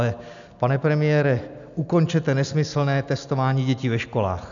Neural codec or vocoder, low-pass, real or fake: none; 7.2 kHz; real